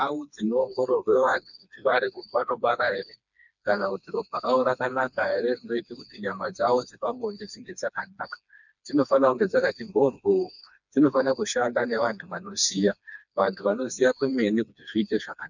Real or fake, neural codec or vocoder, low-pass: fake; codec, 16 kHz, 2 kbps, FreqCodec, smaller model; 7.2 kHz